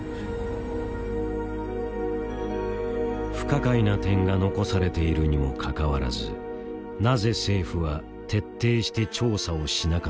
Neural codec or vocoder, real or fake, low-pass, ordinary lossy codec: none; real; none; none